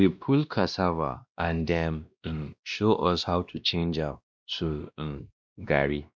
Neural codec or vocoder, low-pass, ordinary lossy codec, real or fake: codec, 16 kHz, 1 kbps, X-Codec, WavLM features, trained on Multilingual LibriSpeech; none; none; fake